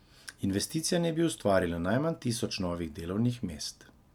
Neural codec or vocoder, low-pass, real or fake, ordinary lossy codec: none; 19.8 kHz; real; none